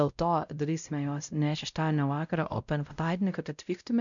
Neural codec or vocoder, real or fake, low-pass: codec, 16 kHz, 0.5 kbps, X-Codec, WavLM features, trained on Multilingual LibriSpeech; fake; 7.2 kHz